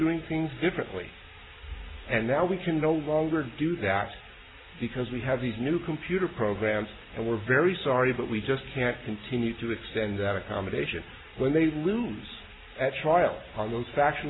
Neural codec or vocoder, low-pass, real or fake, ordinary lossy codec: none; 7.2 kHz; real; AAC, 16 kbps